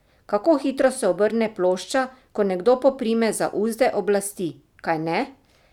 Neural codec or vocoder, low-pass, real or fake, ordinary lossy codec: none; 19.8 kHz; real; none